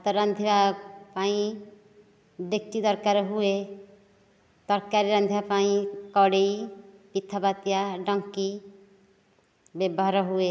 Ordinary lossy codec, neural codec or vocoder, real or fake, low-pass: none; none; real; none